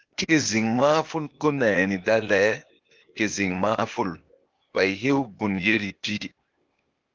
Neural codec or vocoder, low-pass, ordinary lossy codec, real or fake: codec, 16 kHz, 0.8 kbps, ZipCodec; 7.2 kHz; Opus, 32 kbps; fake